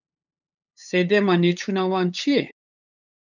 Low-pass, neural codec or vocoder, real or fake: 7.2 kHz; codec, 16 kHz, 8 kbps, FunCodec, trained on LibriTTS, 25 frames a second; fake